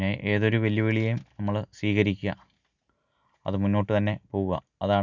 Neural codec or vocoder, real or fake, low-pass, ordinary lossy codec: none; real; 7.2 kHz; none